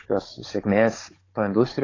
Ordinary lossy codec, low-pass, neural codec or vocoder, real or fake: AAC, 32 kbps; 7.2 kHz; codec, 16 kHz, 16 kbps, FunCodec, trained on Chinese and English, 50 frames a second; fake